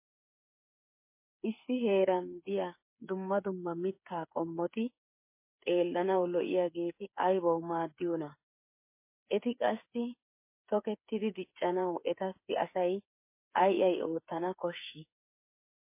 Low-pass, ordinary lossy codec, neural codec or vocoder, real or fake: 3.6 kHz; MP3, 24 kbps; codec, 16 kHz, 8 kbps, FreqCodec, smaller model; fake